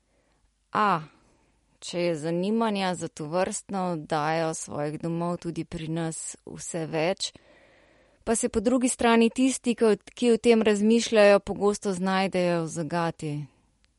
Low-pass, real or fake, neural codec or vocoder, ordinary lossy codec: 14.4 kHz; real; none; MP3, 48 kbps